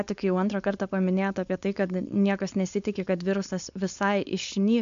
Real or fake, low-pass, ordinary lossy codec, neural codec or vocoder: fake; 7.2 kHz; MP3, 64 kbps; codec, 16 kHz, 4.8 kbps, FACodec